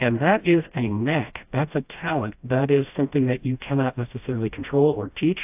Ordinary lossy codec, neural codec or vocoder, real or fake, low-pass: AAC, 32 kbps; codec, 16 kHz, 1 kbps, FreqCodec, smaller model; fake; 3.6 kHz